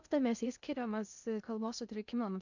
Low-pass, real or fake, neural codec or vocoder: 7.2 kHz; fake; codec, 16 kHz in and 24 kHz out, 0.6 kbps, FocalCodec, streaming, 2048 codes